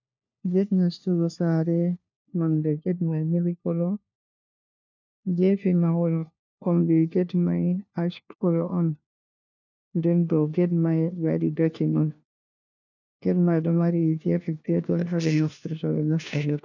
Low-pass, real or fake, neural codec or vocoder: 7.2 kHz; fake; codec, 16 kHz, 1 kbps, FunCodec, trained on LibriTTS, 50 frames a second